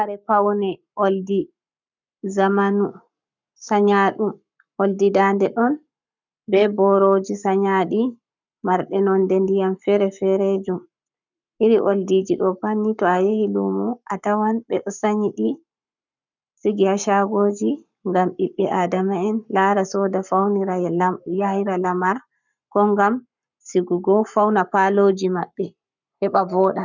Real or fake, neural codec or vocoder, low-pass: fake; codec, 44.1 kHz, 7.8 kbps, Pupu-Codec; 7.2 kHz